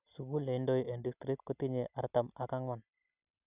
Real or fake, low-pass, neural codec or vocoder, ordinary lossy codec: real; 3.6 kHz; none; none